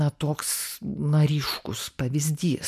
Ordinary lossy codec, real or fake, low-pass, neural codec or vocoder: MP3, 96 kbps; real; 14.4 kHz; none